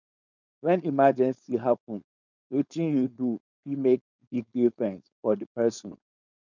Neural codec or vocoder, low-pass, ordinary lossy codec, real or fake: codec, 16 kHz, 4.8 kbps, FACodec; 7.2 kHz; none; fake